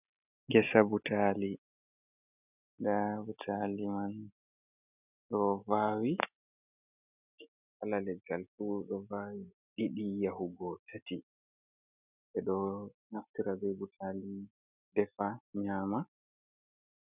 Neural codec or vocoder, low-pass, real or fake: none; 3.6 kHz; real